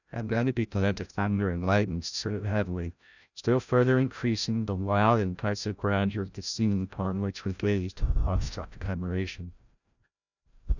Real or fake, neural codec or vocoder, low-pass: fake; codec, 16 kHz, 0.5 kbps, FreqCodec, larger model; 7.2 kHz